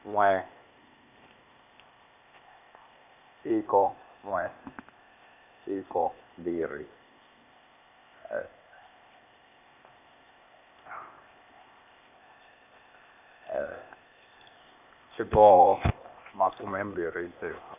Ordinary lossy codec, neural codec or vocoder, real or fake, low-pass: none; codec, 16 kHz, 0.8 kbps, ZipCodec; fake; 3.6 kHz